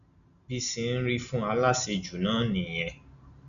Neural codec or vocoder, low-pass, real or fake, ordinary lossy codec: none; 7.2 kHz; real; none